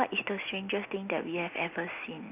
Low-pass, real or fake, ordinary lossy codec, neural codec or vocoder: 3.6 kHz; real; none; none